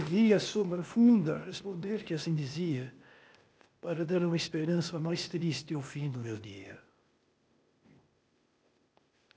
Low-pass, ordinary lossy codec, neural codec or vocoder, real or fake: none; none; codec, 16 kHz, 0.8 kbps, ZipCodec; fake